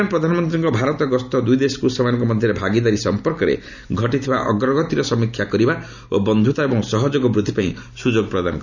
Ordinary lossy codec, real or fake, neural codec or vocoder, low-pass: none; real; none; 7.2 kHz